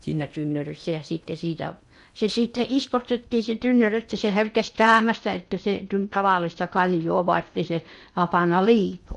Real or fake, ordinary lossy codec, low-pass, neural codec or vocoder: fake; none; 10.8 kHz; codec, 16 kHz in and 24 kHz out, 0.8 kbps, FocalCodec, streaming, 65536 codes